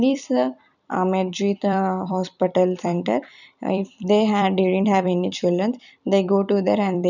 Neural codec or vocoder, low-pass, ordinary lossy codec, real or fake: vocoder, 44.1 kHz, 128 mel bands every 256 samples, BigVGAN v2; 7.2 kHz; none; fake